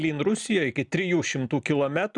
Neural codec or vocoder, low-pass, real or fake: none; 10.8 kHz; real